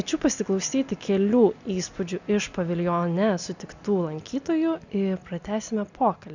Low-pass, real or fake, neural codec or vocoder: 7.2 kHz; real; none